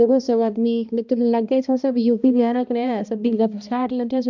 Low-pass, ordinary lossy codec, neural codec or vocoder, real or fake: 7.2 kHz; none; codec, 16 kHz, 1 kbps, X-Codec, HuBERT features, trained on balanced general audio; fake